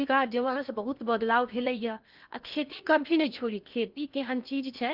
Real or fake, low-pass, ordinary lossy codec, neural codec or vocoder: fake; 5.4 kHz; Opus, 24 kbps; codec, 16 kHz in and 24 kHz out, 0.8 kbps, FocalCodec, streaming, 65536 codes